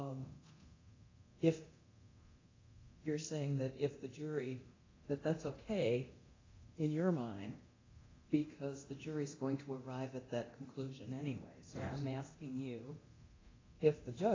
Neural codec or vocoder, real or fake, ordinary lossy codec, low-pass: codec, 24 kHz, 0.9 kbps, DualCodec; fake; AAC, 32 kbps; 7.2 kHz